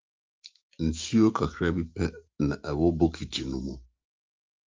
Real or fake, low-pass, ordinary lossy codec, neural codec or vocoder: fake; 7.2 kHz; Opus, 24 kbps; autoencoder, 48 kHz, 128 numbers a frame, DAC-VAE, trained on Japanese speech